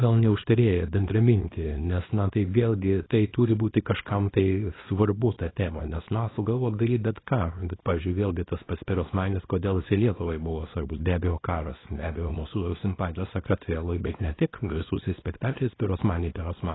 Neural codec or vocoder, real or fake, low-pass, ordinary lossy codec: codec, 24 kHz, 0.9 kbps, WavTokenizer, small release; fake; 7.2 kHz; AAC, 16 kbps